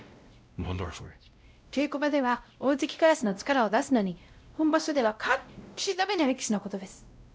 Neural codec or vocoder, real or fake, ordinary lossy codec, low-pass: codec, 16 kHz, 0.5 kbps, X-Codec, WavLM features, trained on Multilingual LibriSpeech; fake; none; none